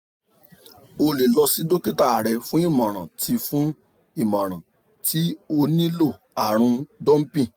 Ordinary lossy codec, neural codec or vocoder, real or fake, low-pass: none; none; real; none